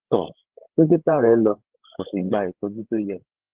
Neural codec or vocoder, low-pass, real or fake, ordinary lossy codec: codec, 16 kHz, 8 kbps, FreqCodec, larger model; 3.6 kHz; fake; Opus, 16 kbps